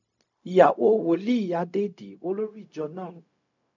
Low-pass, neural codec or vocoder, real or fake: 7.2 kHz; codec, 16 kHz, 0.4 kbps, LongCat-Audio-Codec; fake